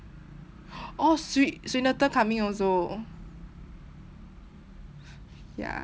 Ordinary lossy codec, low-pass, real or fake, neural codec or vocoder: none; none; real; none